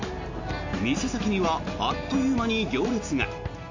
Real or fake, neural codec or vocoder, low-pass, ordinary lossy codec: real; none; 7.2 kHz; AAC, 48 kbps